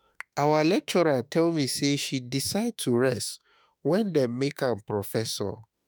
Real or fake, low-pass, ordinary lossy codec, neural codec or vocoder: fake; none; none; autoencoder, 48 kHz, 32 numbers a frame, DAC-VAE, trained on Japanese speech